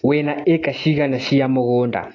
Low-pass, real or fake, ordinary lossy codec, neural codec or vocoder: 7.2 kHz; real; AAC, 32 kbps; none